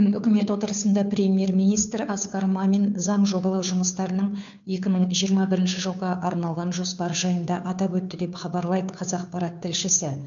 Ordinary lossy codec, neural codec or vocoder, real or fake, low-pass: none; codec, 16 kHz, 2 kbps, FunCodec, trained on Chinese and English, 25 frames a second; fake; 7.2 kHz